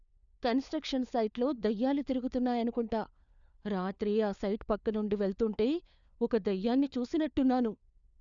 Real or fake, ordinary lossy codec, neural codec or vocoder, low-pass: fake; none; codec, 16 kHz, 4 kbps, FunCodec, trained on LibriTTS, 50 frames a second; 7.2 kHz